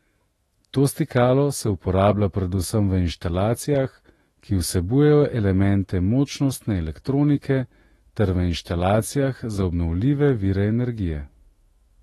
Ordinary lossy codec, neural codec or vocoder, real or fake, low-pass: AAC, 32 kbps; autoencoder, 48 kHz, 128 numbers a frame, DAC-VAE, trained on Japanese speech; fake; 19.8 kHz